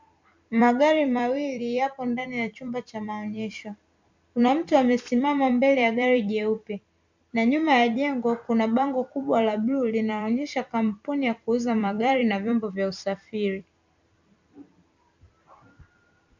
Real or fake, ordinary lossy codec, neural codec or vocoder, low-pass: fake; MP3, 64 kbps; vocoder, 44.1 kHz, 128 mel bands every 256 samples, BigVGAN v2; 7.2 kHz